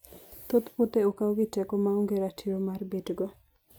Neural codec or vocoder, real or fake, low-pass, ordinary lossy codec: none; real; none; none